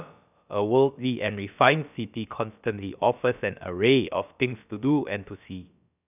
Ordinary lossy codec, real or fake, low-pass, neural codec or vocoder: none; fake; 3.6 kHz; codec, 16 kHz, about 1 kbps, DyCAST, with the encoder's durations